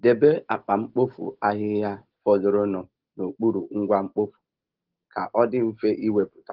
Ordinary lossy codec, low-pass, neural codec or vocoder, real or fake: Opus, 16 kbps; 5.4 kHz; none; real